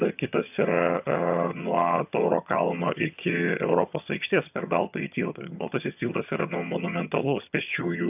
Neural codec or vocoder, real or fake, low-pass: vocoder, 22.05 kHz, 80 mel bands, HiFi-GAN; fake; 3.6 kHz